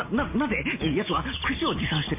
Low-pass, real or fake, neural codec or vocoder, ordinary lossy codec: 3.6 kHz; real; none; MP3, 32 kbps